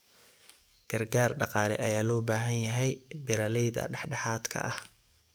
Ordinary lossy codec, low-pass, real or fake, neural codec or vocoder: none; none; fake; codec, 44.1 kHz, 7.8 kbps, DAC